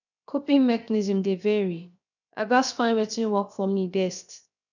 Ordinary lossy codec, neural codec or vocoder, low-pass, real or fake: none; codec, 16 kHz, 0.7 kbps, FocalCodec; 7.2 kHz; fake